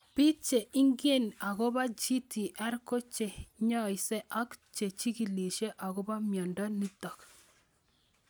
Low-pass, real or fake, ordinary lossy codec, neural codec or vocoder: none; real; none; none